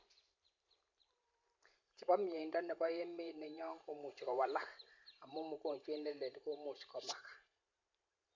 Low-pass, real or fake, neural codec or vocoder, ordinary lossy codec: 7.2 kHz; fake; vocoder, 44.1 kHz, 128 mel bands every 512 samples, BigVGAN v2; MP3, 64 kbps